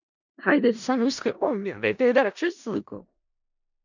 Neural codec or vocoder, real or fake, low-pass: codec, 16 kHz in and 24 kHz out, 0.4 kbps, LongCat-Audio-Codec, four codebook decoder; fake; 7.2 kHz